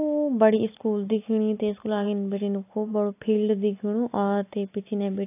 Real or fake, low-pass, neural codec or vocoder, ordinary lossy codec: real; 3.6 kHz; none; AAC, 24 kbps